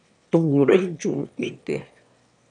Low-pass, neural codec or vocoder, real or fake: 9.9 kHz; autoencoder, 22.05 kHz, a latent of 192 numbers a frame, VITS, trained on one speaker; fake